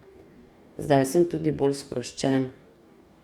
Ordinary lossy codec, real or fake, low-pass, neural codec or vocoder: Opus, 64 kbps; fake; 19.8 kHz; codec, 44.1 kHz, 2.6 kbps, DAC